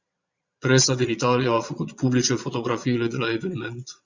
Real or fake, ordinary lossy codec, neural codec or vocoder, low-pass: fake; Opus, 64 kbps; vocoder, 22.05 kHz, 80 mel bands, Vocos; 7.2 kHz